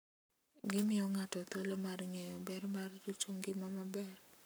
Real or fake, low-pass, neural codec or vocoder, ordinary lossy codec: fake; none; codec, 44.1 kHz, 7.8 kbps, Pupu-Codec; none